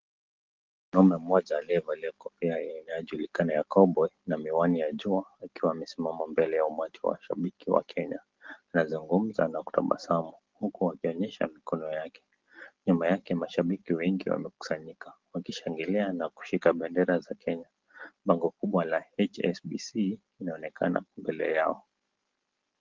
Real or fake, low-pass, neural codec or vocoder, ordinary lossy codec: real; 7.2 kHz; none; Opus, 16 kbps